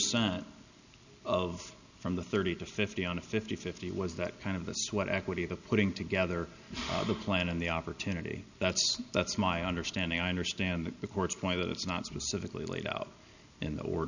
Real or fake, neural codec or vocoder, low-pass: real; none; 7.2 kHz